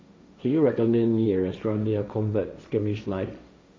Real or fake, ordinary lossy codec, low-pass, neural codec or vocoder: fake; none; none; codec, 16 kHz, 1.1 kbps, Voila-Tokenizer